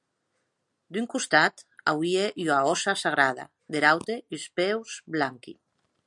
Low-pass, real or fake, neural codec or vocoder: 10.8 kHz; real; none